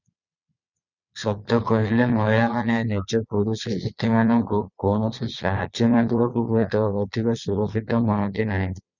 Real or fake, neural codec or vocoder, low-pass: fake; vocoder, 22.05 kHz, 80 mel bands, Vocos; 7.2 kHz